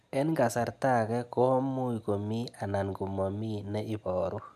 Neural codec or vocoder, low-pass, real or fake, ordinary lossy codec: none; 14.4 kHz; real; none